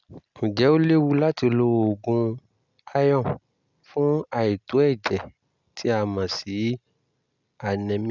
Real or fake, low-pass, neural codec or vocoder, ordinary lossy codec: real; 7.2 kHz; none; none